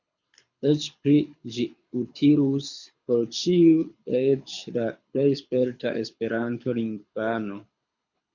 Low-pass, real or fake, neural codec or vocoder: 7.2 kHz; fake; codec, 24 kHz, 6 kbps, HILCodec